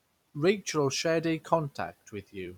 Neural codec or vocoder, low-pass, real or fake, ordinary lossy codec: vocoder, 44.1 kHz, 128 mel bands every 256 samples, BigVGAN v2; 19.8 kHz; fake; none